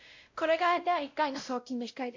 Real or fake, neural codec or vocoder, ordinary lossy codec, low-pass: fake; codec, 16 kHz, 0.5 kbps, X-Codec, WavLM features, trained on Multilingual LibriSpeech; MP3, 48 kbps; 7.2 kHz